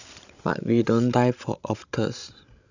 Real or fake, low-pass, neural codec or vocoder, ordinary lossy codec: fake; 7.2 kHz; codec, 16 kHz, 16 kbps, FreqCodec, larger model; none